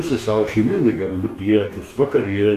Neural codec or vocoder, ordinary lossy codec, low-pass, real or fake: codec, 44.1 kHz, 2.6 kbps, DAC; AAC, 64 kbps; 14.4 kHz; fake